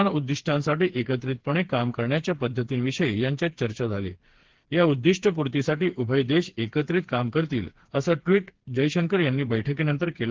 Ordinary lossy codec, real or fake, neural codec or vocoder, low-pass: Opus, 16 kbps; fake; codec, 16 kHz, 4 kbps, FreqCodec, smaller model; 7.2 kHz